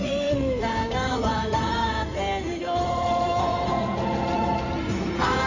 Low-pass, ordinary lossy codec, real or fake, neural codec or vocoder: 7.2 kHz; none; fake; codec, 16 kHz in and 24 kHz out, 2.2 kbps, FireRedTTS-2 codec